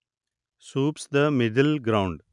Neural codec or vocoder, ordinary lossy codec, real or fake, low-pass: none; none; real; 10.8 kHz